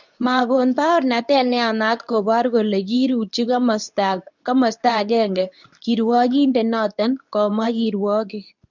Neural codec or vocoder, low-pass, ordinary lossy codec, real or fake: codec, 24 kHz, 0.9 kbps, WavTokenizer, medium speech release version 2; 7.2 kHz; none; fake